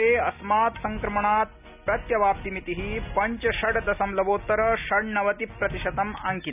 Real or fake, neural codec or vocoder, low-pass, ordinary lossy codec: real; none; 3.6 kHz; none